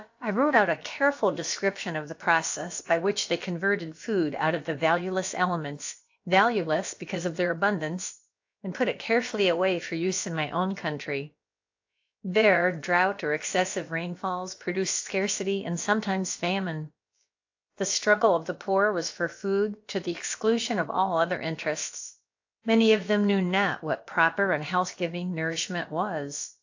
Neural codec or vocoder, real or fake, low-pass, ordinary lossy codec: codec, 16 kHz, about 1 kbps, DyCAST, with the encoder's durations; fake; 7.2 kHz; AAC, 48 kbps